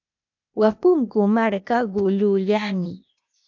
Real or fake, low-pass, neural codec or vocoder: fake; 7.2 kHz; codec, 16 kHz, 0.8 kbps, ZipCodec